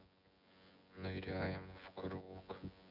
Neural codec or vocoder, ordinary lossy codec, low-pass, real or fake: vocoder, 24 kHz, 100 mel bands, Vocos; none; 5.4 kHz; fake